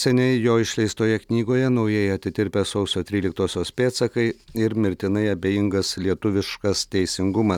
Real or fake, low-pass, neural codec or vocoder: real; 19.8 kHz; none